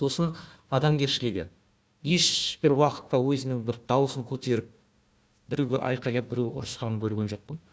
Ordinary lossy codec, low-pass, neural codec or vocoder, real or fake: none; none; codec, 16 kHz, 1 kbps, FunCodec, trained on Chinese and English, 50 frames a second; fake